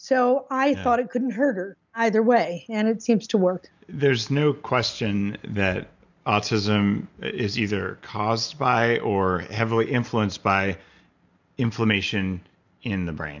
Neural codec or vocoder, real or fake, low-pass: none; real; 7.2 kHz